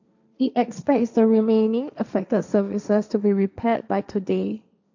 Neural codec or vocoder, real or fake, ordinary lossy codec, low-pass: codec, 16 kHz, 1.1 kbps, Voila-Tokenizer; fake; none; 7.2 kHz